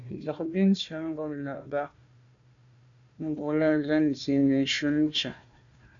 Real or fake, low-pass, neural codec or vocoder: fake; 7.2 kHz; codec, 16 kHz, 1 kbps, FunCodec, trained on Chinese and English, 50 frames a second